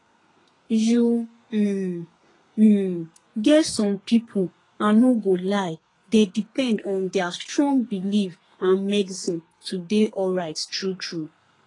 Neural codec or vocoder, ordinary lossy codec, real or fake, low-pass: codec, 32 kHz, 1.9 kbps, SNAC; AAC, 32 kbps; fake; 10.8 kHz